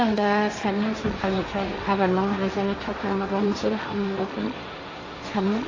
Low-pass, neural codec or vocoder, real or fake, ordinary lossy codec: 7.2 kHz; codec, 16 kHz, 1.1 kbps, Voila-Tokenizer; fake; AAC, 32 kbps